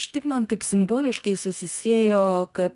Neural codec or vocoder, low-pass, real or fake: codec, 24 kHz, 0.9 kbps, WavTokenizer, medium music audio release; 10.8 kHz; fake